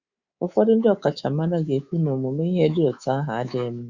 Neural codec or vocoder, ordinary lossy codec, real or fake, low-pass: codec, 24 kHz, 3.1 kbps, DualCodec; Opus, 64 kbps; fake; 7.2 kHz